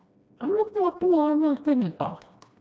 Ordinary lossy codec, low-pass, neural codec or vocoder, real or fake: none; none; codec, 16 kHz, 1 kbps, FreqCodec, smaller model; fake